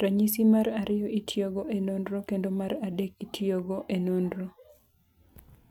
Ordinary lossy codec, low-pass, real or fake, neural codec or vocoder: none; 19.8 kHz; real; none